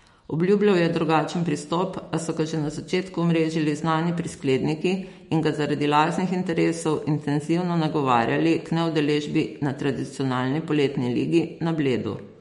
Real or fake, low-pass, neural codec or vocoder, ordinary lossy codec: fake; 19.8 kHz; autoencoder, 48 kHz, 128 numbers a frame, DAC-VAE, trained on Japanese speech; MP3, 48 kbps